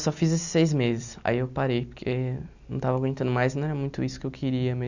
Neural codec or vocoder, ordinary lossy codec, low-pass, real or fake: none; none; 7.2 kHz; real